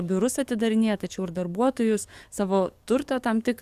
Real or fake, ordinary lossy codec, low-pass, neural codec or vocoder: fake; Opus, 64 kbps; 14.4 kHz; codec, 44.1 kHz, 7.8 kbps, DAC